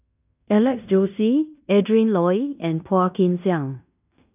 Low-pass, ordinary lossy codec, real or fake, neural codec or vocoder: 3.6 kHz; none; fake; codec, 16 kHz in and 24 kHz out, 0.9 kbps, LongCat-Audio-Codec, fine tuned four codebook decoder